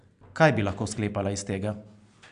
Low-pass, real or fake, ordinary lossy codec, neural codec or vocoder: 9.9 kHz; real; AAC, 96 kbps; none